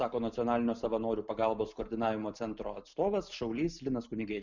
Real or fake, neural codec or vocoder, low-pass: real; none; 7.2 kHz